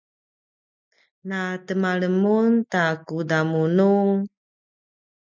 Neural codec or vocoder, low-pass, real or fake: none; 7.2 kHz; real